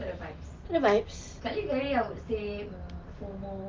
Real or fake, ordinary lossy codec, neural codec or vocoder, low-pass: real; Opus, 32 kbps; none; 7.2 kHz